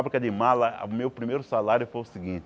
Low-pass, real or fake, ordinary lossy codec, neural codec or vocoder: none; real; none; none